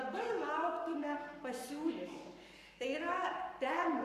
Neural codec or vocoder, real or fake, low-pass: codec, 44.1 kHz, 7.8 kbps, Pupu-Codec; fake; 14.4 kHz